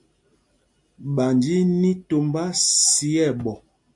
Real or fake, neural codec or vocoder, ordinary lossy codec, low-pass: real; none; MP3, 64 kbps; 10.8 kHz